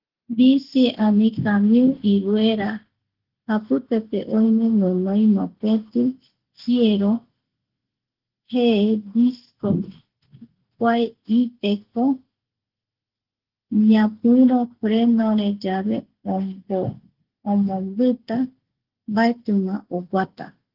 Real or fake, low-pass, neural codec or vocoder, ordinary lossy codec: real; 5.4 kHz; none; Opus, 16 kbps